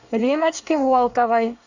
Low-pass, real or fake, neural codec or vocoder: 7.2 kHz; fake; codec, 24 kHz, 1 kbps, SNAC